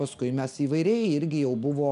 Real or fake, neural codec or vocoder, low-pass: real; none; 10.8 kHz